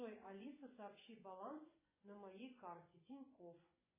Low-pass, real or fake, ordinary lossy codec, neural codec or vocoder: 3.6 kHz; fake; MP3, 16 kbps; vocoder, 24 kHz, 100 mel bands, Vocos